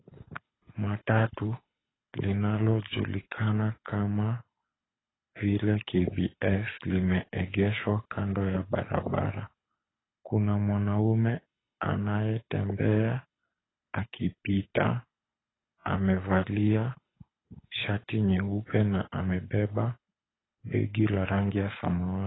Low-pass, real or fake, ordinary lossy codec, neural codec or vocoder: 7.2 kHz; fake; AAC, 16 kbps; codec, 24 kHz, 6 kbps, HILCodec